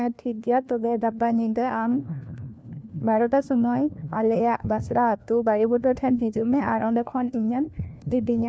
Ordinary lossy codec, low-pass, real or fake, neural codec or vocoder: none; none; fake; codec, 16 kHz, 1 kbps, FunCodec, trained on LibriTTS, 50 frames a second